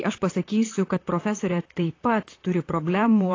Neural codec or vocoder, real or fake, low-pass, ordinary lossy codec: vocoder, 44.1 kHz, 128 mel bands, Pupu-Vocoder; fake; 7.2 kHz; AAC, 32 kbps